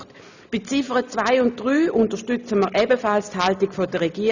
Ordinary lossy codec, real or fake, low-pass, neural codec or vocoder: none; real; 7.2 kHz; none